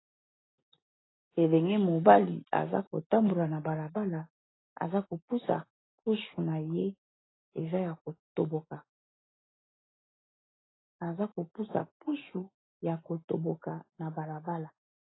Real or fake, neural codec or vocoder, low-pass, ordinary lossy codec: real; none; 7.2 kHz; AAC, 16 kbps